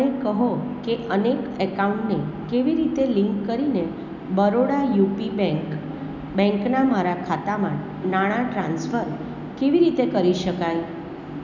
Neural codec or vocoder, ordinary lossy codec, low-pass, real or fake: none; none; 7.2 kHz; real